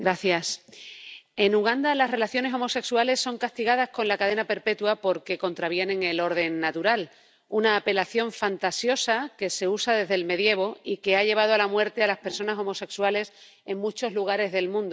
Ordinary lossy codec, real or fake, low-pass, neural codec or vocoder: none; real; none; none